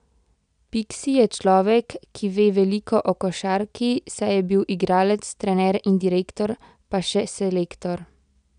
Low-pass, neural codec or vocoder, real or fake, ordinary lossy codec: 9.9 kHz; none; real; none